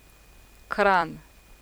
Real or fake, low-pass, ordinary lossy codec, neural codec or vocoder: fake; none; none; vocoder, 44.1 kHz, 128 mel bands every 256 samples, BigVGAN v2